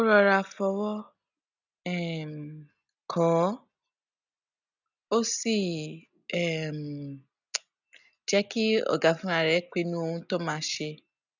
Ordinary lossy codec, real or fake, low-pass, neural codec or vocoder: none; real; 7.2 kHz; none